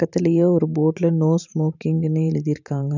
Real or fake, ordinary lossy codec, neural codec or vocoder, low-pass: fake; none; vocoder, 44.1 kHz, 128 mel bands every 512 samples, BigVGAN v2; 7.2 kHz